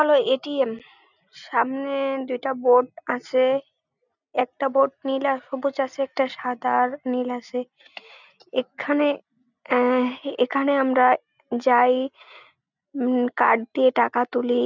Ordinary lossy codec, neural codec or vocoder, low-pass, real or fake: none; none; 7.2 kHz; real